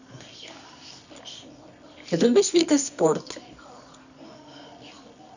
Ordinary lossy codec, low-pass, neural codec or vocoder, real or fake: none; 7.2 kHz; codec, 24 kHz, 0.9 kbps, WavTokenizer, medium music audio release; fake